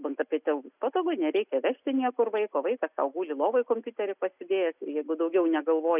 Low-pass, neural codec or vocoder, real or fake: 3.6 kHz; none; real